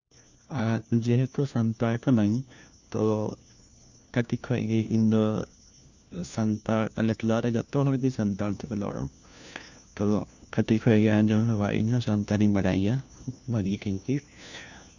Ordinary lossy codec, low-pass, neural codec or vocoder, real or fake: none; 7.2 kHz; codec, 16 kHz, 1 kbps, FunCodec, trained on LibriTTS, 50 frames a second; fake